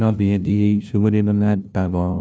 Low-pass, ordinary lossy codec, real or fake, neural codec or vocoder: none; none; fake; codec, 16 kHz, 0.5 kbps, FunCodec, trained on LibriTTS, 25 frames a second